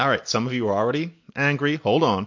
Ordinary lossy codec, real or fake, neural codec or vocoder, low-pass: MP3, 48 kbps; real; none; 7.2 kHz